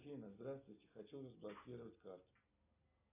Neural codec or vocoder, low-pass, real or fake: none; 3.6 kHz; real